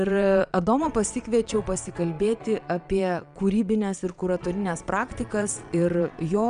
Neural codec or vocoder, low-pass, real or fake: vocoder, 22.05 kHz, 80 mel bands, Vocos; 9.9 kHz; fake